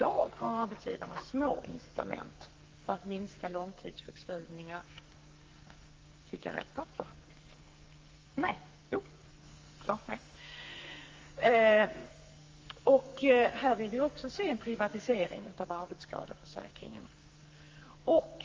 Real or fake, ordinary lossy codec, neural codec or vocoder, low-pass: fake; Opus, 32 kbps; codec, 44.1 kHz, 2.6 kbps, SNAC; 7.2 kHz